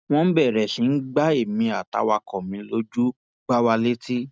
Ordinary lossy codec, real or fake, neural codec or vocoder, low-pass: none; real; none; none